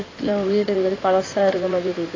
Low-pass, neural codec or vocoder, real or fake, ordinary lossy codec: 7.2 kHz; codec, 16 kHz in and 24 kHz out, 2.2 kbps, FireRedTTS-2 codec; fake; AAC, 32 kbps